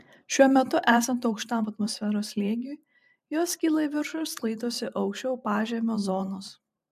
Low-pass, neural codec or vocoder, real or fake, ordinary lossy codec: 14.4 kHz; vocoder, 44.1 kHz, 128 mel bands every 512 samples, BigVGAN v2; fake; MP3, 96 kbps